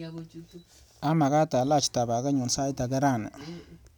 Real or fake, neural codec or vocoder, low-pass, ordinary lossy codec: real; none; none; none